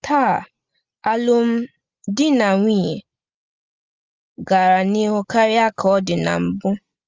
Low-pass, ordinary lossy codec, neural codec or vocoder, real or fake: 7.2 kHz; Opus, 32 kbps; none; real